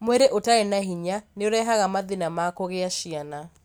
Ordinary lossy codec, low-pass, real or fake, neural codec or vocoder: none; none; real; none